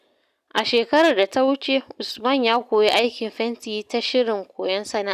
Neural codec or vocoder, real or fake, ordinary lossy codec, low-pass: none; real; none; 14.4 kHz